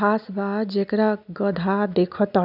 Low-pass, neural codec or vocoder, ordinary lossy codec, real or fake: 5.4 kHz; none; none; real